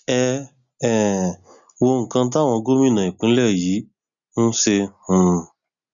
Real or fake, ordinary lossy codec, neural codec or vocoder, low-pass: real; MP3, 96 kbps; none; 7.2 kHz